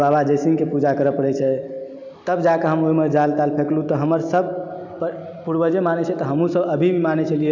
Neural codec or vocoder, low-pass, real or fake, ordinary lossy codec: none; 7.2 kHz; real; none